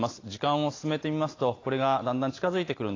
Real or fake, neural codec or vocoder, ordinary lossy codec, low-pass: real; none; AAC, 32 kbps; 7.2 kHz